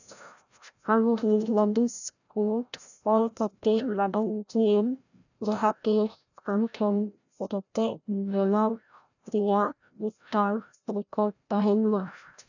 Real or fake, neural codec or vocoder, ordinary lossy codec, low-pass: fake; codec, 16 kHz, 0.5 kbps, FreqCodec, larger model; none; 7.2 kHz